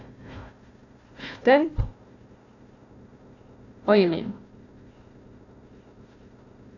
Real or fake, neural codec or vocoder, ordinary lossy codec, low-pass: fake; codec, 16 kHz, 1 kbps, FunCodec, trained on Chinese and English, 50 frames a second; none; 7.2 kHz